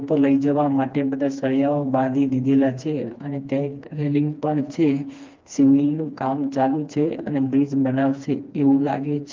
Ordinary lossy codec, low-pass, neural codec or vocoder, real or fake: Opus, 24 kbps; 7.2 kHz; codec, 16 kHz, 2 kbps, FreqCodec, smaller model; fake